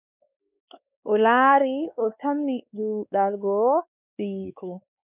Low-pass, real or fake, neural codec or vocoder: 3.6 kHz; fake; codec, 16 kHz, 2 kbps, X-Codec, WavLM features, trained on Multilingual LibriSpeech